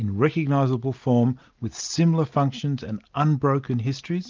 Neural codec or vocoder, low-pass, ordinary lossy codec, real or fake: none; 7.2 kHz; Opus, 32 kbps; real